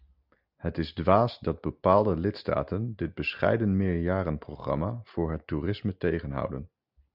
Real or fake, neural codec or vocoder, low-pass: real; none; 5.4 kHz